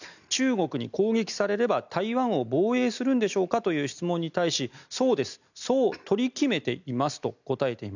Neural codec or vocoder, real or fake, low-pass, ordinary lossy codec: none; real; 7.2 kHz; none